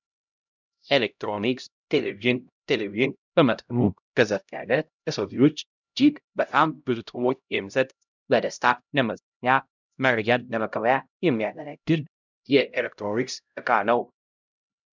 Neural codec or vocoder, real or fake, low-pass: codec, 16 kHz, 0.5 kbps, X-Codec, HuBERT features, trained on LibriSpeech; fake; 7.2 kHz